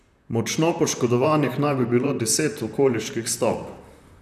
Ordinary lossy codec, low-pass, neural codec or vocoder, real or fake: none; 14.4 kHz; vocoder, 44.1 kHz, 128 mel bands, Pupu-Vocoder; fake